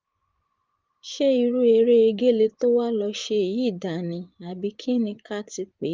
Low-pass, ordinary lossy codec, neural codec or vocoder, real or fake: 7.2 kHz; Opus, 32 kbps; vocoder, 24 kHz, 100 mel bands, Vocos; fake